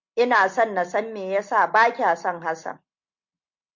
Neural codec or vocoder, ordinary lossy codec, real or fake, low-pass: none; MP3, 48 kbps; real; 7.2 kHz